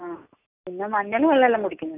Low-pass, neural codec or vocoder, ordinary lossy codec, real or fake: 3.6 kHz; none; none; real